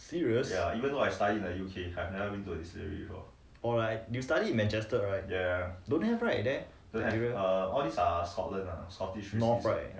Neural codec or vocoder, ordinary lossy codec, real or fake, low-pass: none; none; real; none